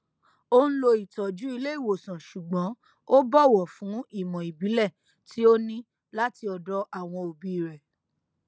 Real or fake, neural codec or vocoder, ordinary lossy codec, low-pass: real; none; none; none